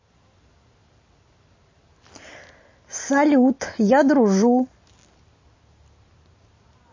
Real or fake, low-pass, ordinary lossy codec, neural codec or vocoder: real; 7.2 kHz; MP3, 32 kbps; none